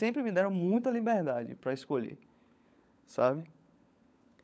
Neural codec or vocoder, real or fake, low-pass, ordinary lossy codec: codec, 16 kHz, 16 kbps, FunCodec, trained on LibriTTS, 50 frames a second; fake; none; none